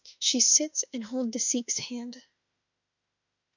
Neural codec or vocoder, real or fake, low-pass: autoencoder, 48 kHz, 32 numbers a frame, DAC-VAE, trained on Japanese speech; fake; 7.2 kHz